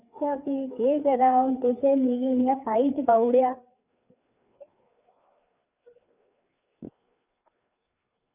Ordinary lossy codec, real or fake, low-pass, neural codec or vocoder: none; fake; 3.6 kHz; codec, 16 kHz, 4 kbps, FreqCodec, larger model